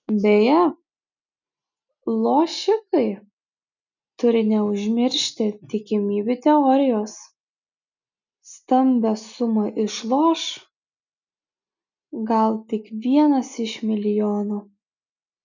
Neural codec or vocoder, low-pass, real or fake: none; 7.2 kHz; real